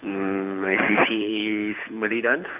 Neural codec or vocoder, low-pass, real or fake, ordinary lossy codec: codec, 24 kHz, 6 kbps, HILCodec; 3.6 kHz; fake; none